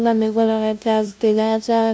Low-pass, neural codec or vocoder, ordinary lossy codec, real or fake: none; codec, 16 kHz, 0.5 kbps, FunCodec, trained on LibriTTS, 25 frames a second; none; fake